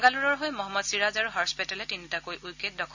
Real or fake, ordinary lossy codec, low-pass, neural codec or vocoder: real; none; 7.2 kHz; none